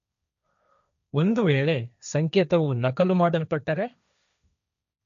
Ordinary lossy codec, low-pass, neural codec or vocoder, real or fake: none; 7.2 kHz; codec, 16 kHz, 1.1 kbps, Voila-Tokenizer; fake